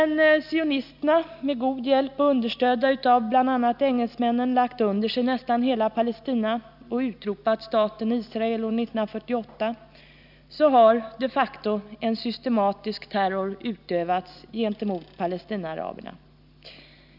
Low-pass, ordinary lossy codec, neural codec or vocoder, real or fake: 5.4 kHz; none; none; real